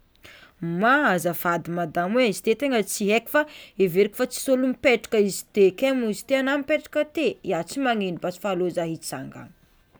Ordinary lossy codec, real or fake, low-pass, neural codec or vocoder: none; real; none; none